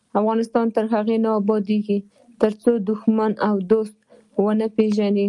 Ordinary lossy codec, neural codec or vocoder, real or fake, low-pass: Opus, 32 kbps; none; real; 10.8 kHz